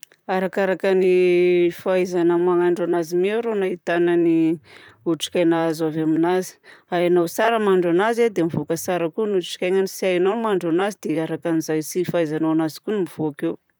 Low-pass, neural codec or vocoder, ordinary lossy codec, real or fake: none; vocoder, 44.1 kHz, 128 mel bands, Pupu-Vocoder; none; fake